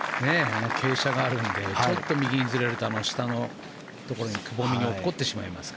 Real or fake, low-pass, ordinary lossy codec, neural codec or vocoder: real; none; none; none